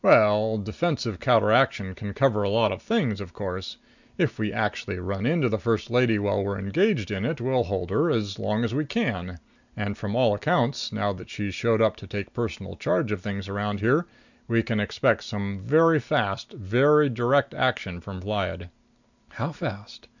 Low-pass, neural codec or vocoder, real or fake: 7.2 kHz; none; real